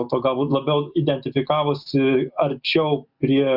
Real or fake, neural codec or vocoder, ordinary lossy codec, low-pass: real; none; Opus, 64 kbps; 5.4 kHz